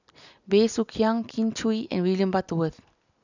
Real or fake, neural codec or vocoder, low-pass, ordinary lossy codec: real; none; 7.2 kHz; none